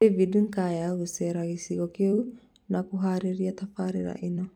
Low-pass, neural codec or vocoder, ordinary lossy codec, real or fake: 19.8 kHz; vocoder, 44.1 kHz, 128 mel bands every 256 samples, BigVGAN v2; none; fake